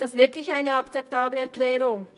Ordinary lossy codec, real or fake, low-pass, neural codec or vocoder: none; fake; 10.8 kHz; codec, 24 kHz, 0.9 kbps, WavTokenizer, medium music audio release